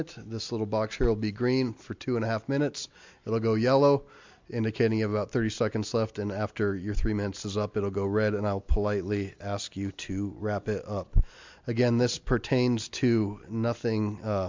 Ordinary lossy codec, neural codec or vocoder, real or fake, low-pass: MP3, 64 kbps; none; real; 7.2 kHz